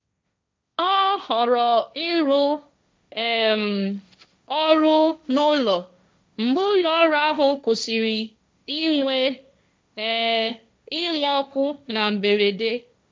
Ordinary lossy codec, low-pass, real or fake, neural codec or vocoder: none; none; fake; codec, 16 kHz, 1.1 kbps, Voila-Tokenizer